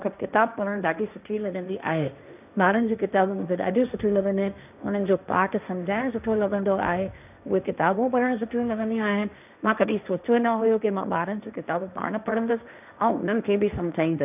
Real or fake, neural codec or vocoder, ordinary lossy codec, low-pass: fake; codec, 16 kHz, 1.1 kbps, Voila-Tokenizer; none; 3.6 kHz